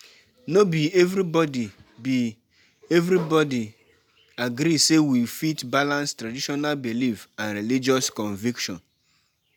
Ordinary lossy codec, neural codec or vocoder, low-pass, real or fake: none; none; none; real